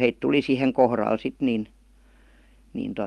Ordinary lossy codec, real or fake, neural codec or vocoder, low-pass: Opus, 32 kbps; real; none; 19.8 kHz